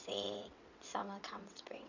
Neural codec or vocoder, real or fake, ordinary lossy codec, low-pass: vocoder, 22.05 kHz, 80 mel bands, WaveNeXt; fake; none; 7.2 kHz